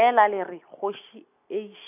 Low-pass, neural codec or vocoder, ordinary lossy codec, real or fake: 3.6 kHz; none; none; real